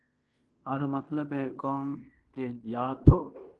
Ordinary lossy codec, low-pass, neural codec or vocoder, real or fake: Opus, 24 kbps; 10.8 kHz; codec, 16 kHz in and 24 kHz out, 0.9 kbps, LongCat-Audio-Codec, fine tuned four codebook decoder; fake